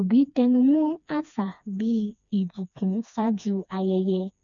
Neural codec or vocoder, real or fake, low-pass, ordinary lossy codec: codec, 16 kHz, 2 kbps, FreqCodec, smaller model; fake; 7.2 kHz; none